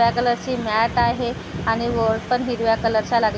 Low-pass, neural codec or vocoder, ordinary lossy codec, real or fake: none; none; none; real